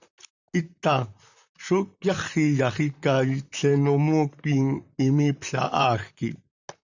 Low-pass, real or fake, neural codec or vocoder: 7.2 kHz; fake; vocoder, 44.1 kHz, 128 mel bands, Pupu-Vocoder